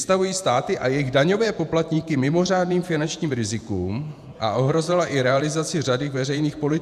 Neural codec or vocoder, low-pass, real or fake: vocoder, 48 kHz, 128 mel bands, Vocos; 14.4 kHz; fake